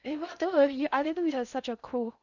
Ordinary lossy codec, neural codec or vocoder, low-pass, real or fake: none; codec, 16 kHz in and 24 kHz out, 0.6 kbps, FocalCodec, streaming, 2048 codes; 7.2 kHz; fake